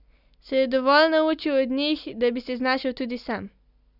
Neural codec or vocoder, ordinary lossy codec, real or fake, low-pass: none; none; real; 5.4 kHz